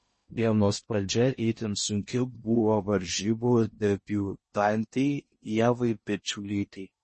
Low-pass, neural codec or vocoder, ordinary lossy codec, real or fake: 10.8 kHz; codec, 16 kHz in and 24 kHz out, 0.6 kbps, FocalCodec, streaming, 2048 codes; MP3, 32 kbps; fake